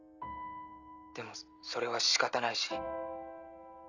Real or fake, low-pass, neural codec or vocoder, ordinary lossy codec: real; 7.2 kHz; none; none